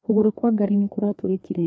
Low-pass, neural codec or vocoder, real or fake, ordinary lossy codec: none; codec, 16 kHz, 2 kbps, FreqCodec, larger model; fake; none